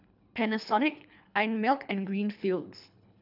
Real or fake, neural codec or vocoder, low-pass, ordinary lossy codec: fake; codec, 24 kHz, 3 kbps, HILCodec; 5.4 kHz; none